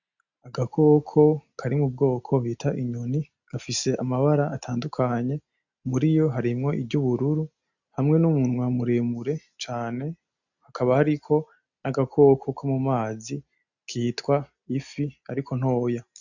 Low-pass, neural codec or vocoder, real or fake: 7.2 kHz; none; real